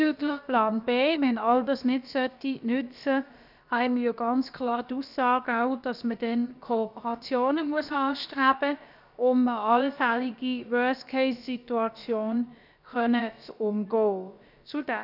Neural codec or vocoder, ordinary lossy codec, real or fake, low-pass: codec, 16 kHz, about 1 kbps, DyCAST, with the encoder's durations; none; fake; 5.4 kHz